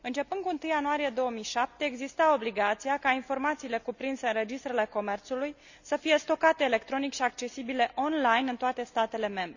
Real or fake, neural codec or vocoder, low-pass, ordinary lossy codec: real; none; 7.2 kHz; none